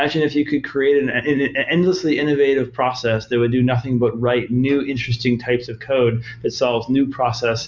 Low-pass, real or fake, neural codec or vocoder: 7.2 kHz; real; none